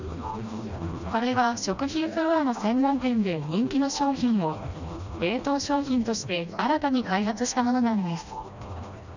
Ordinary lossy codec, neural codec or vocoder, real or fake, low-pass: none; codec, 16 kHz, 1 kbps, FreqCodec, smaller model; fake; 7.2 kHz